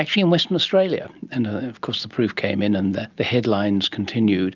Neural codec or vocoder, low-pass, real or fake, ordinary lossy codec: none; 7.2 kHz; real; Opus, 24 kbps